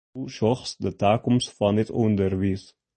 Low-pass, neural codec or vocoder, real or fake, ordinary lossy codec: 10.8 kHz; none; real; MP3, 32 kbps